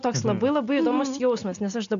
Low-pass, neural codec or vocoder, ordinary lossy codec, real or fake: 7.2 kHz; none; MP3, 96 kbps; real